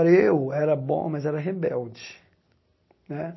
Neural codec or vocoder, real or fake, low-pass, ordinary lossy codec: none; real; 7.2 kHz; MP3, 24 kbps